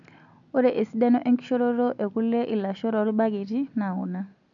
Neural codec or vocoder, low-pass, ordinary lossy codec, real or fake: none; 7.2 kHz; MP3, 64 kbps; real